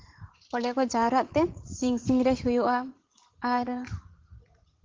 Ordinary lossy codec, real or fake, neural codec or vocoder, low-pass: Opus, 16 kbps; fake; vocoder, 44.1 kHz, 80 mel bands, Vocos; 7.2 kHz